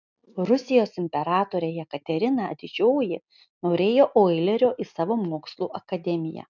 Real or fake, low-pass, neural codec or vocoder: real; 7.2 kHz; none